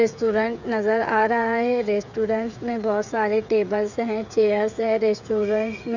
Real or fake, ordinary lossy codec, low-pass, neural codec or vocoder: fake; none; 7.2 kHz; codec, 16 kHz, 8 kbps, FreqCodec, smaller model